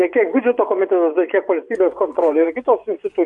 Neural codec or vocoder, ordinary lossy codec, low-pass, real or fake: vocoder, 24 kHz, 100 mel bands, Vocos; AAC, 64 kbps; 10.8 kHz; fake